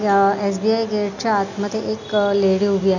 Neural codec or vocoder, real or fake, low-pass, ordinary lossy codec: none; real; 7.2 kHz; none